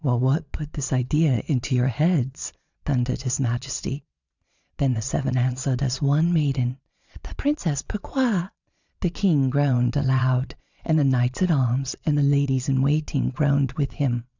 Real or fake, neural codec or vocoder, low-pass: fake; vocoder, 22.05 kHz, 80 mel bands, Vocos; 7.2 kHz